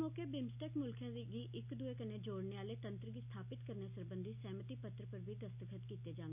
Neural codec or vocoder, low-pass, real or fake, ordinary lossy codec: none; 3.6 kHz; real; none